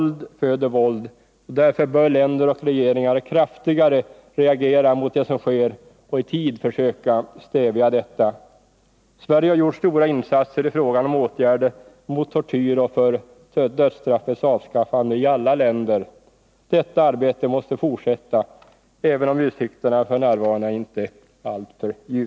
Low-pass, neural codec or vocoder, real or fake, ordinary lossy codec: none; none; real; none